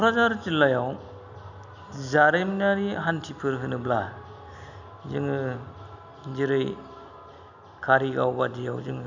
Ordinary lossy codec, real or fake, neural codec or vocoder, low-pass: none; real; none; 7.2 kHz